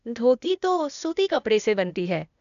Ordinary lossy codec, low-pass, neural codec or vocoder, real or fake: none; 7.2 kHz; codec, 16 kHz, 0.8 kbps, ZipCodec; fake